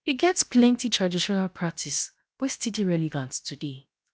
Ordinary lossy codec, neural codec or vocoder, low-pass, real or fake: none; codec, 16 kHz, 0.7 kbps, FocalCodec; none; fake